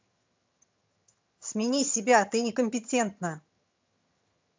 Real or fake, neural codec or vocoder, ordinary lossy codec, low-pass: fake; vocoder, 22.05 kHz, 80 mel bands, HiFi-GAN; MP3, 64 kbps; 7.2 kHz